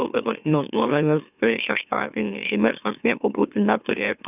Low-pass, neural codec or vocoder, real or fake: 3.6 kHz; autoencoder, 44.1 kHz, a latent of 192 numbers a frame, MeloTTS; fake